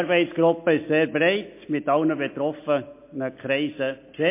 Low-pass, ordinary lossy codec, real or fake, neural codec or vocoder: 3.6 kHz; MP3, 24 kbps; real; none